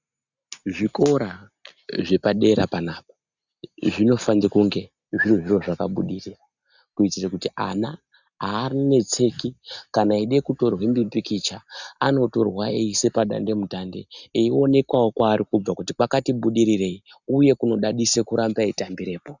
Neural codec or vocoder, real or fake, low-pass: none; real; 7.2 kHz